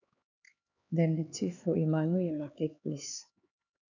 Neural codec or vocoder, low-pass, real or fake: codec, 16 kHz, 4 kbps, X-Codec, HuBERT features, trained on LibriSpeech; 7.2 kHz; fake